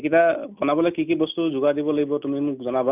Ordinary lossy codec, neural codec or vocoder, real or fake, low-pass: none; none; real; 3.6 kHz